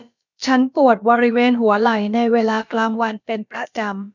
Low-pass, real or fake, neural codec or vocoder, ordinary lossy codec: 7.2 kHz; fake; codec, 16 kHz, about 1 kbps, DyCAST, with the encoder's durations; none